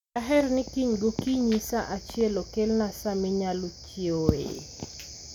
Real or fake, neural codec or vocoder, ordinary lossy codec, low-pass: real; none; none; 19.8 kHz